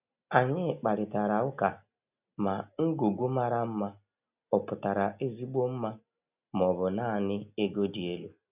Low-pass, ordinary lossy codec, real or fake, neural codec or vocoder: 3.6 kHz; none; real; none